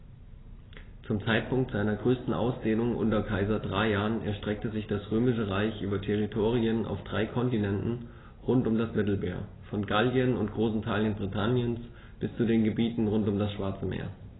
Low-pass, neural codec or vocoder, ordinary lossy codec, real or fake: 7.2 kHz; none; AAC, 16 kbps; real